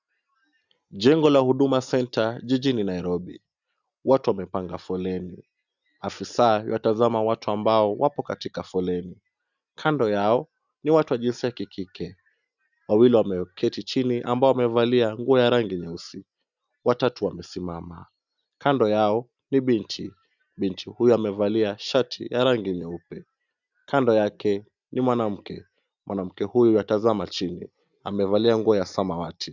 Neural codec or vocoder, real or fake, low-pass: none; real; 7.2 kHz